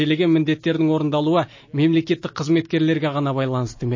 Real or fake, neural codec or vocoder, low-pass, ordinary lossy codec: real; none; 7.2 kHz; MP3, 32 kbps